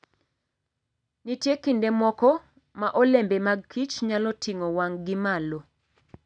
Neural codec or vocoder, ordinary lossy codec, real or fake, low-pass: none; none; real; 9.9 kHz